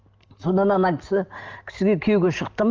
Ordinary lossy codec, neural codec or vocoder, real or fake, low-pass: Opus, 24 kbps; none; real; 7.2 kHz